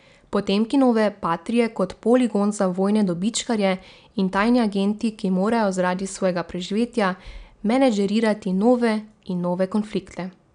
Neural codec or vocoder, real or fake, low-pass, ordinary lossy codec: none; real; 9.9 kHz; none